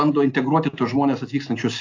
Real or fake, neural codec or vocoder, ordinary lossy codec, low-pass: real; none; AAC, 48 kbps; 7.2 kHz